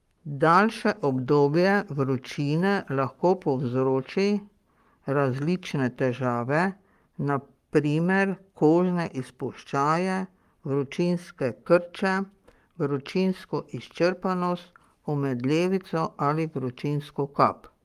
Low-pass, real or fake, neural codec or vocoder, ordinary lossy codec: 14.4 kHz; fake; codec, 44.1 kHz, 7.8 kbps, Pupu-Codec; Opus, 32 kbps